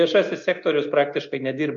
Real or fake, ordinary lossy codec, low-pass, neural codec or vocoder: real; MP3, 48 kbps; 7.2 kHz; none